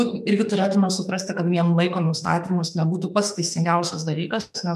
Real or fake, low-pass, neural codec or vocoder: fake; 14.4 kHz; autoencoder, 48 kHz, 32 numbers a frame, DAC-VAE, trained on Japanese speech